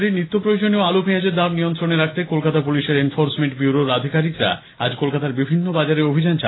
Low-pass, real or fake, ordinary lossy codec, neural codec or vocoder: 7.2 kHz; real; AAC, 16 kbps; none